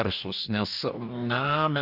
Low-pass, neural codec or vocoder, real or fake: 5.4 kHz; codec, 44.1 kHz, 2.6 kbps, DAC; fake